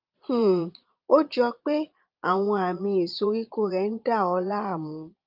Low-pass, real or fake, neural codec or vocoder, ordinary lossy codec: 5.4 kHz; fake; vocoder, 22.05 kHz, 80 mel bands, Vocos; Opus, 24 kbps